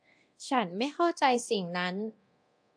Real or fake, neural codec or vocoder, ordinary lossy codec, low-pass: fake; codec, 24 kHz, 0.9 kbps, DualCodec; AAC, 64 kbps; 9.9 kHz